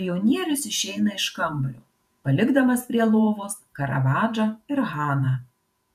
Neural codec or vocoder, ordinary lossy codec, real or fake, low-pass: vocoder, 48 kHz, 128 mel bands, Vocos; MP3, 96 kbps; fake; 14.4 kHz